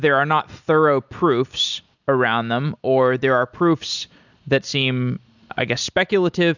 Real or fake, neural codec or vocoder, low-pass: real; none; 7.2 kHz